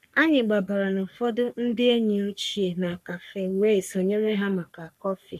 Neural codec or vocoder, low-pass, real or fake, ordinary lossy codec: codec, 44.1 kHz, 3.4 kbps, Pupu-Codec; 14.4 kHz; fake; none